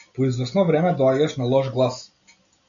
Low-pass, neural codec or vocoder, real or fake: 7.2 kHz; none; real